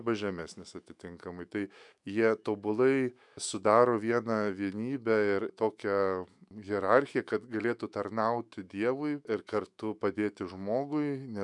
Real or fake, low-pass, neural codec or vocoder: fake; 10.8 kHz; autoencoder, 48 kHz, 128 numbers a frame, DAC-VAE, trained on Japanese speech